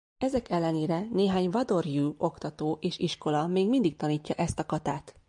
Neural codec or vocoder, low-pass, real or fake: none; 10.8 kHz; real